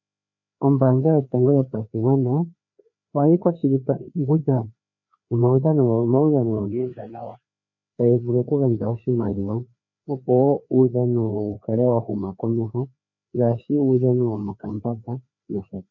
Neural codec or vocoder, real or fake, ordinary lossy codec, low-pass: codec, 16 kHz, 2 kbps, FreqCodec, larger model; fake; MP3, 48 kbps; 7.2 kHz